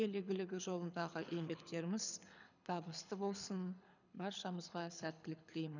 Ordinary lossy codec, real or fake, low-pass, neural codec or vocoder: none; fake; 7.2 kHz; codec, 24 kHz, 6 kbps, HILCodec